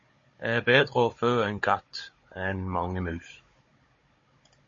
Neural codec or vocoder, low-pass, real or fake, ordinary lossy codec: codec, 16 kHz, 16 kbps, FunCodec, trained on Chinese and English, 50 frames a second; 7.2 kHz; fake; MP3, 32 kbps